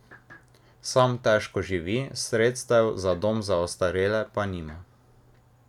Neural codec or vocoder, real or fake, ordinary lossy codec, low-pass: none; real; none; 19.8 kHz